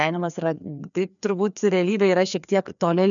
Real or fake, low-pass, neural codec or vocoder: fake; 7.2 kHz; codec, 16 kHz, 2 kbps, FreqCodec, larger model